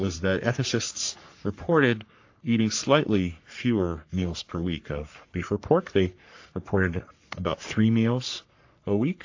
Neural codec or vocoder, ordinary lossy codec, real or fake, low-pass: codec, 44.1 kHz, 3.4 kbps, Pupu-Codec; AAC, 48 kbps; fake; 7.2 kHz